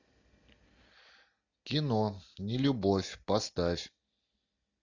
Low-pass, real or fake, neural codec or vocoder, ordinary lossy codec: 7.2 kHz; real; none; MP3, 64 kbps